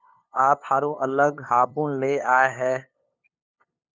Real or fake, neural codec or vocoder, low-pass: fake; codec, 16 kHz, 2 kbps, FunCodec, trained on LibriTTS, 25 frames a second; 7.2 kHz